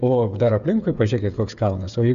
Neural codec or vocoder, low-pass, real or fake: codec, 16 kHz, 8 kbps, FreqCodec, smaller model; 7.2 kHz; fake